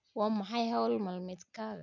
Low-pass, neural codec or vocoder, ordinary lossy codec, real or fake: 7.2 kHz; none; none; real